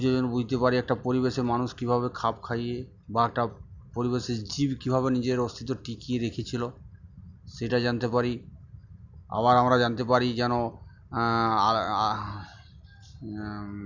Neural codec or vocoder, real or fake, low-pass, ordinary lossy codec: none; real; 7.2 kHz; Opus, 64 kbps